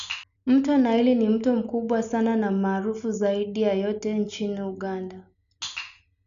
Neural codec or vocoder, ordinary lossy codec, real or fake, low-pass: none; MP3, 96 kbps; real; 7.2 kHz